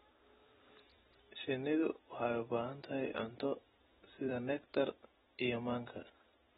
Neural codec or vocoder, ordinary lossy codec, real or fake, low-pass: vocoder, 44.1 kHz, 128 mel bands every 256 samples, BigVGAN v2; AAC, 16 kbps; fake; 19.8 kHz